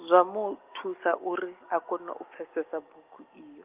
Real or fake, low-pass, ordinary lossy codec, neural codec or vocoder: real; 3.6 kHz; Opus, 32 kbps; none